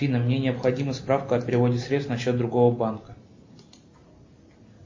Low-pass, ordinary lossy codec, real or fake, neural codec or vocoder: 7.2 kHz; MP3, 32 kbps; real; none